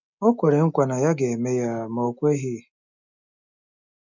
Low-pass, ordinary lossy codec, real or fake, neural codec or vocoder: 7.2 kHz; none; real; none